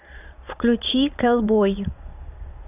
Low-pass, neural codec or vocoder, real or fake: 3.6 kHz; vocoder, 22.05 kHz, 80 mel bands, Vocos; fake